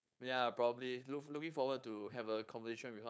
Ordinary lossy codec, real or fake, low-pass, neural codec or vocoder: none; fake; none; codec, 16 kHz, 4.8 kbps, FACodec